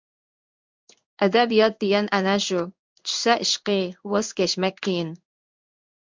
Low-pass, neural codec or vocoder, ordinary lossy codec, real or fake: 7.2 kHz; codec, 16 kHz in and 24 kHz out, 1 kbps, XY-Tokenizer; MP3, 64 kbps; fake